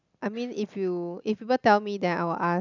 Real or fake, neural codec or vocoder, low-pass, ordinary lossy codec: real; none; 7.2 kHz; none